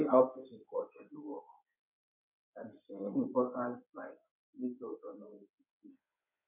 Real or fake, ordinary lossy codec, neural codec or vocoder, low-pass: fake; none; codec, 16 kHz in and 24 kHz out, 2.2 kbps, FireRedTTS-2 codec; 3.6 kHz